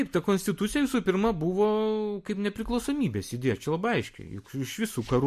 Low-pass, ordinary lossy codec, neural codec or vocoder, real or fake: 14.4 kHz; MP3, 64 kbps; none; real